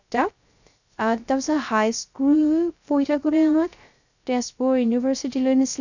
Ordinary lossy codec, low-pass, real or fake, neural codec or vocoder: none; 7.2 kHz; fake; codec, 16 kHz, 0.3 kbps, FocalCodec